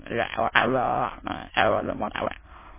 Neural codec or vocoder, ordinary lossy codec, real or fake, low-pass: autoencoder, 22.05 kHz, a latent of 192 numbers a frame, VITS, trained on many speakers; MP3, 16 kbps; fake; 3.6 kHz